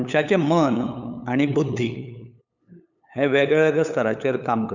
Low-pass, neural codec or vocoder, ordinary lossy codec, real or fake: 7.2 kHz; codec, 16 kHz, 8 kbps, FunCodec, trained on LibriTTS, 25 frames a second; none; fake